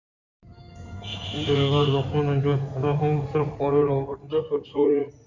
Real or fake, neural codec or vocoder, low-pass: fake; codec, 16 kHz in and 24 kHz out, 2.2 kbps, FireRedTTS-2 codec; 7.2 kHz